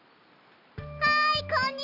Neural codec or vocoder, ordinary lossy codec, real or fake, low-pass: none; none; real; 5.4 kHz